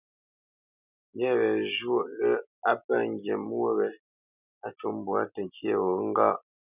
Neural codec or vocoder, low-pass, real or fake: none; 3.6 kHz; real